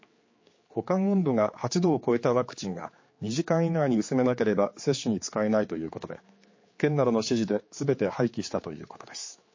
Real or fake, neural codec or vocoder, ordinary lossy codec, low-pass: fake; codec, 16 kHz, 4 kbps, X-Codec, HuBERT features, trained on general audio; MP3, 32 kbps; 7.2 kHz